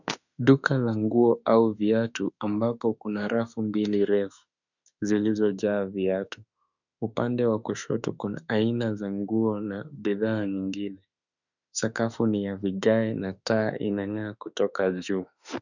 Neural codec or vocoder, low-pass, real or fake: autoencoder, 48 kHz, 32 numbers a frame, DAC-VAE, trained on Japanese speech; 7.2 kHz; fake